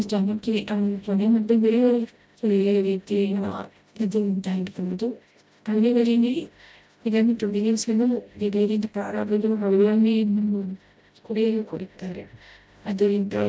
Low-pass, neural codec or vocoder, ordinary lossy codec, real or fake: none; codec, 16 kHz, 0.5 kbps, FreqCodec, smaller model; none; fake